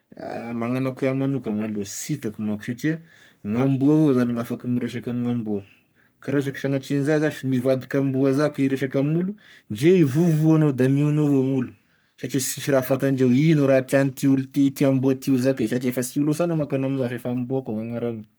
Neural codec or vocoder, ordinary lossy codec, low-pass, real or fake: codec, 44.1 kHz, 3.4 kbps, Pupu-Codec; none; none; fake